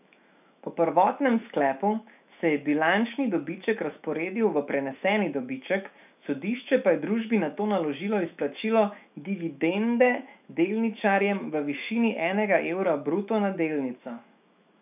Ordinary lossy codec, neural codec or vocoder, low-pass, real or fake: none; autoencoder, 48 kHz, 128 numbers a frame, DAC-VAE, trained on Japanese speech; 3.6 kHz; fake